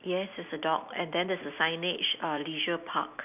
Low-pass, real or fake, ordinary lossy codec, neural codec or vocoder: 3.6 kHz; real; none; none